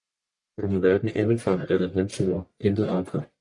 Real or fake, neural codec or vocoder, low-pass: fake; codec, 44.1 kHz, 1.7 kbps, Pupu-Codec; 10.8 kHz